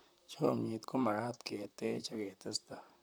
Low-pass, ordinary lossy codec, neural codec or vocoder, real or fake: none; none; vocoder, 44.1 kHz, 128 mel bands, Pupu-Vocoder; fake